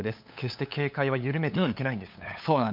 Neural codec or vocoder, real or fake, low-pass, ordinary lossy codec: codec, 16 kHz, 8 kbps, FunCodec, trained on LibriTTS, 25 frames a second; fake; 5.4 kHz; MP3, 48 kbps